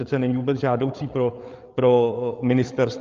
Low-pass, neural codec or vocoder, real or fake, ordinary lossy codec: 7.2 kHz; codec, 16 kHz, 8 kbps, FreqCodec, larger model; fake; Opus, 24 kbps